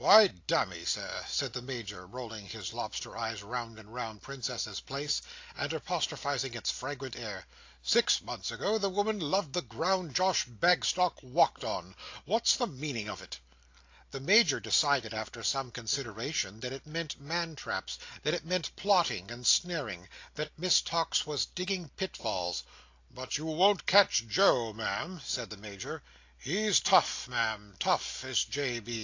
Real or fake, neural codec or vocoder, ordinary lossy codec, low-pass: real; none; AAC, 48 kbps; 7.2 kHz